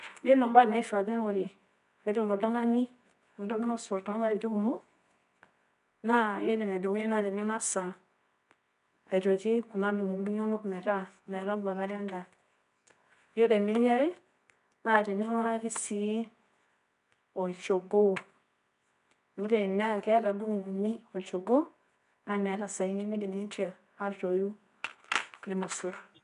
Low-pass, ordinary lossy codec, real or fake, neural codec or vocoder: 10.8 kHz; none; fake; codec, 24 kHz, 0.9 kbps, WavTokenizer, medium music audio release